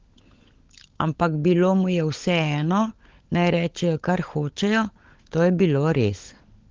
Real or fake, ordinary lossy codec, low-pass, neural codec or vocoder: fake; Opus, 16 kbps; 7.2 kHz; codec, 16 kHz, 16 kbps, FunCodec, trained on LibriTTS, 50 frames a second